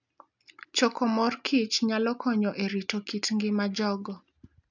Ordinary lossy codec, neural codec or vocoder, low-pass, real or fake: none; none; 7.2 kHz; real